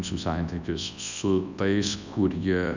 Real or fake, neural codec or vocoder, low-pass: fake; codec, 24 kHz, 0.9 kbps, WavTokenizer, large speech release; 7.2 kHz